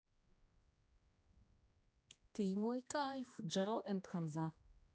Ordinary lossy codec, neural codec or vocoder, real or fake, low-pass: none; codec, 16 kHz, 1 kbps, X-Codec, HuBERT features, trained on general audio; fake; none